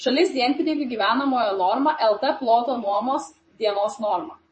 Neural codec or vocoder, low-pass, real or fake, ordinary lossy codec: vocoder, 44.1 kHz, 128 mel bands, Pupu-Vocoder; 10.8 kHz; fake; MP3, 32 kbps